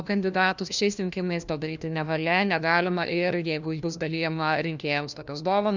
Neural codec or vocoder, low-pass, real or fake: codec, 16 kHz, 1 kbps, FunCodec, trained on LibriTTS, 50 frames a second; 7.2 kHz; fake